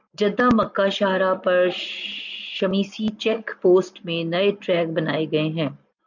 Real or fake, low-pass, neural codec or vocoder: real; 7.2 kHz; none